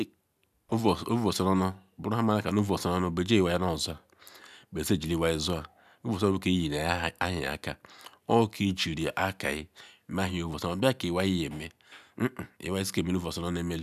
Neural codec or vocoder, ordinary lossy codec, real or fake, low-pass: none; none; real; 14.4 kHz